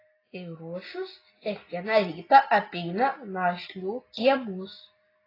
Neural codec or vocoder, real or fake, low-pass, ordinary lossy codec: none; real; 5.4 kHz; AAC, 24 kbps